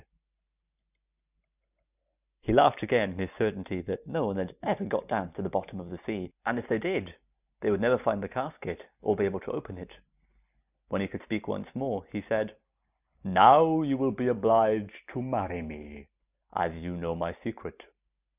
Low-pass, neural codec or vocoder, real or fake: 3.6 kHz; none; real